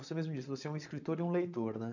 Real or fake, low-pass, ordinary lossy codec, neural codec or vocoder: fake; 7.2 kHz; none; vocoder, 22.05 kHz, 80 mel bands, Vocos